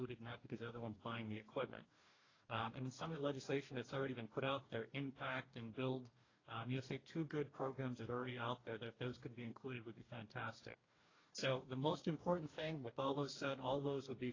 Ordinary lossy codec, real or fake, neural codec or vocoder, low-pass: AAC, 32 kbps; fake; codec, 44.1 kHz, 2.6 kbps, DAC; 7.2 kHz